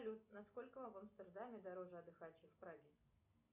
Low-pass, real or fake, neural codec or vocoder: 3.6 kHz; real; none